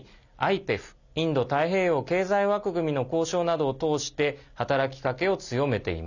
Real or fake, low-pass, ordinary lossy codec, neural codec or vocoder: real; 7.2 kHz; none; none